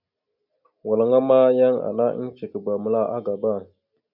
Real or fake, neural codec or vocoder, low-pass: real; none; 5.4 kHz